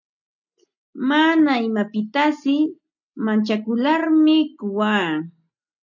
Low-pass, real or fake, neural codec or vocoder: 7.2 kHz; real; none